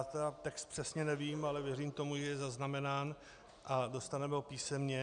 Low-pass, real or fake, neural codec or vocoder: 9.9 kHz; real; none